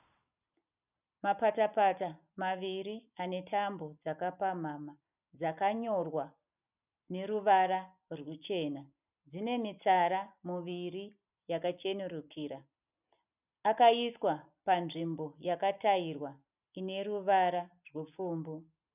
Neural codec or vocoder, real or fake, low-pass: none; real; 3.6 kHz